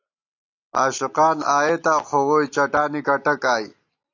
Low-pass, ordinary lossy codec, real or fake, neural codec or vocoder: 7.2 kHz; AAC, 48 kbps; real; none